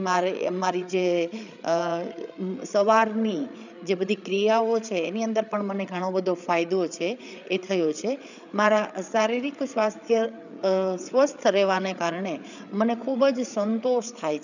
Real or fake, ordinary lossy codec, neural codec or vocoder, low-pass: fake; none; codec, 16 kHz, 8 kbps, FreqCodec, larger model; 7.2 kHz